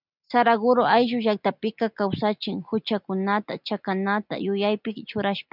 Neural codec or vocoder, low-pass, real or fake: none; 5.4 kHz; real